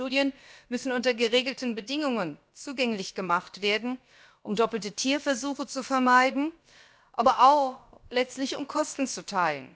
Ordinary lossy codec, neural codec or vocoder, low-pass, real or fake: none; codec, 16 kHz, about 1 kbps, DyCAST, with the encoder's durations; none; fake